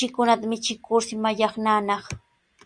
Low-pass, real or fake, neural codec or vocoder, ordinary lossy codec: 9.9 kHz; real; none; Opus, 64 kbps